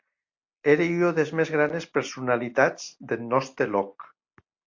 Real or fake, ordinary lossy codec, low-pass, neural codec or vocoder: real; MP3, 48 kbps; 7.2 kHz; none